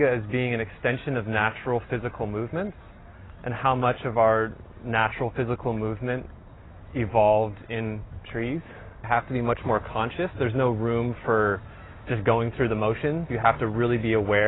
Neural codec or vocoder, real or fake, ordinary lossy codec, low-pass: none; real; AAC, 16 kbps; 7.2 kHz